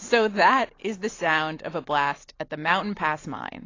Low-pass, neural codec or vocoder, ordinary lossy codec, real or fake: 7.2 kHz; none; AAC, 32 kbps; real